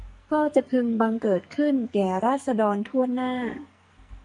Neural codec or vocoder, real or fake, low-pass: codec, 44.1 kHz, 2.6 kbps, SNAC; fake; 10.8 kHz